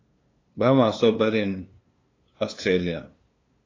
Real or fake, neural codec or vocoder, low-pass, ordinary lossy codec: fake; codec, 16 kHz, 2 kbps, FunCodec, trained on LibriTTS, 25 frames a second; 7.2 kHz; AAC, 32 kbps